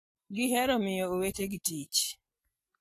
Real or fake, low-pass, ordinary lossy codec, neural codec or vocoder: real; 14.4 kHz; AAC, 48 kbps; none